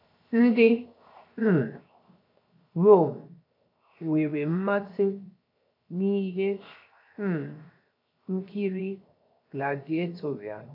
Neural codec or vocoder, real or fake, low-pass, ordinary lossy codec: codec, 16 kHz, 0.7 kbps, FocalCodec; fake; 5.4 kHz; AAC, 32 kbps